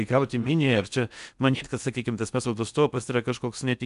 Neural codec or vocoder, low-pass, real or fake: codec, 16 kHz in and 24 kHz out, 0.6 kbps, FocalCodec, streaming, 4096 codes; 10.8 kHz; fake